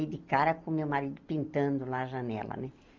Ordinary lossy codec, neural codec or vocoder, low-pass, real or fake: Opus, 24 kbps; none; 7.2 kHz; real